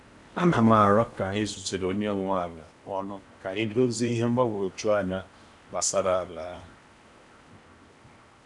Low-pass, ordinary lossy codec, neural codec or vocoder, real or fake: 10.8 kHz; none; codec, 16 kHz in and 24 kHz out, 0.8 kbps, FocalCodec, streaming, 65536 codes; fake